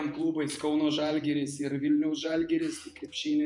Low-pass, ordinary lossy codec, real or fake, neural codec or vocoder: 10.8 kHz; MP3, 96 kbps; fake; vocoder, 48 kHz, 128 mel bands, Vocos